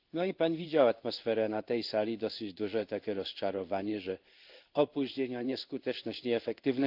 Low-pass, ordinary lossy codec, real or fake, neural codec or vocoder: 5.4 kHz; Opus, 32 kbps; fake; codec, 16 kHz in and 24 kHz out, 1 kbps, XY-Tokenizer